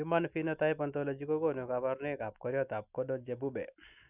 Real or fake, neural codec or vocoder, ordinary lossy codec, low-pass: real; none; none; 3.6 kHz